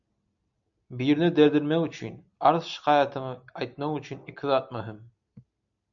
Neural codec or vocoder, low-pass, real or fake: none; 7.2 kHz; real